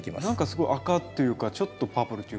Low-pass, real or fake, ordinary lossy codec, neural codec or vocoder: none; real; none; none